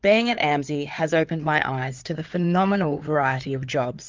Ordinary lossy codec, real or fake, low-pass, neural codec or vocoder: Opus, 24 kbps; fake; 7.2 kHz; codec, 16 kHz in and 24 kHz out, 2.2 kbps, FireRedTTS-2 codec